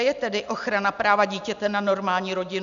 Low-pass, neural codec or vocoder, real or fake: 7.2 kHz; none; real